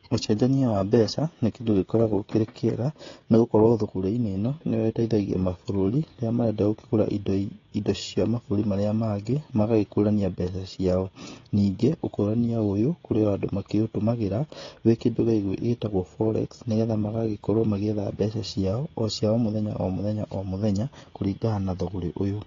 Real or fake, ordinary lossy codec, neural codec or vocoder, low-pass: fake; AAC, 32 kbps; codec, 16 kHz, 16 kbps, FreqCodec, smaller model; 7.2 kHz